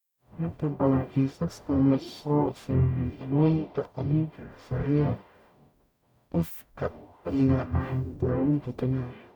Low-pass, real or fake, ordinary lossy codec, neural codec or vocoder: 19.8 kHz; fake; none; codec, 44.1 kHz, 0.9 kbps, DAC